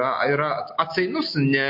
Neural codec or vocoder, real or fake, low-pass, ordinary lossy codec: none; real; 5.4 kHz; MP3, 32 kbps